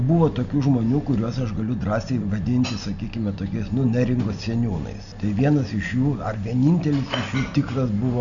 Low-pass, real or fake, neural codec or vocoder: 7.2 kHz; real; none